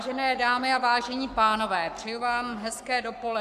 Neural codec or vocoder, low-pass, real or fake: codec, 44.1 kHz, 7.8 kbps, Pupu-Codec; 14.4 kHz; fake